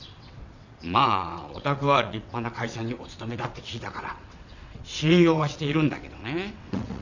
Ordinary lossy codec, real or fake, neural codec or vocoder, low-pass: none; fake; vocoder, 22.05 kHz, 80 mel bands, WaveNeXt; 7.2 kHz